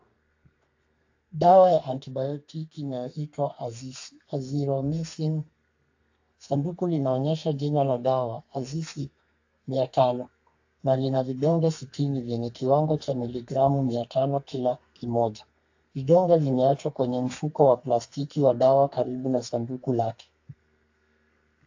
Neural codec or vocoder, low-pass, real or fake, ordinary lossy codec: codec, 32 kHz, 1.9 kbps, SNAC; 7.2 kHz; fake; AAC, 48 kbps